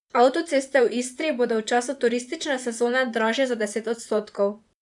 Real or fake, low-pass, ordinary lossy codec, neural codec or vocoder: fake; 10.8 kHz; none; vocoder, 44.1 kHz, 128 mel bands, Pupu-Vocoder